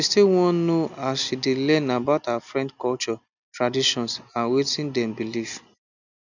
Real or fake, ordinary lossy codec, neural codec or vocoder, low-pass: real; none; none; 7.2 kHz